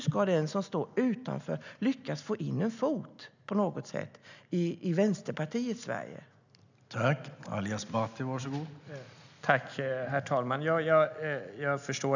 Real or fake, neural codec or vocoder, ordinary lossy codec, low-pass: real; none; none; 7.2 kHz